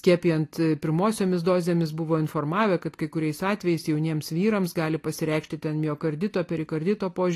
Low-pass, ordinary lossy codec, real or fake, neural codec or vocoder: 14.4 kHz; AAC, 48 kbps; real; none